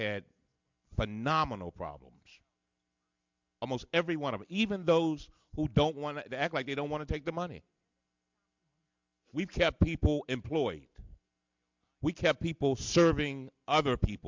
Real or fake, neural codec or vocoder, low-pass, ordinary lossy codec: real; none; 7.2 kHz; MP3, 64 kbps